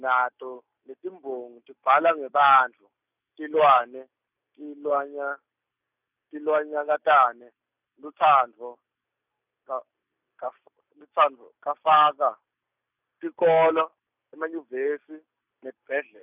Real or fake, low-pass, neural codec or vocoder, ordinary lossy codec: real; 3.6 kHz; none; none